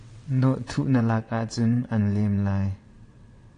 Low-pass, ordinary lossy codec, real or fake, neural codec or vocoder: 9.9 kHz; AAC, 48 kbps; fake; vocoder, 22.05 kHz, 80 mel bands, WaveNeXt